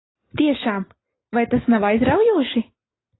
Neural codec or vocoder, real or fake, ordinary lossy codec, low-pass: none; real; AAC, 16 kbps; 7.2 kHz